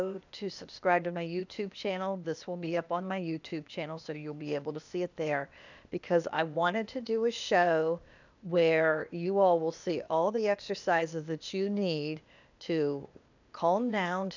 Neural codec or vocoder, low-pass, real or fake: codec, 16 kHz, 0.8 kbps, ZipCodec; 7.2 kHz; fake